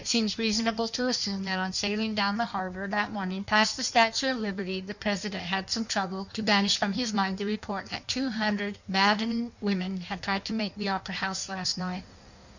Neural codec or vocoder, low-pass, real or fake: codec, 16 kHz in and 24 kHz out, 1.1 kbps, FireRedTTS-2 codec; 7.2 kHz; fake